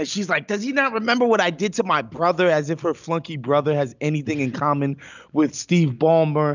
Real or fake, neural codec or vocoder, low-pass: real; none; 7.2 kHz